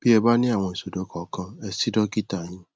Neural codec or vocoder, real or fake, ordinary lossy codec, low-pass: none; real; none; none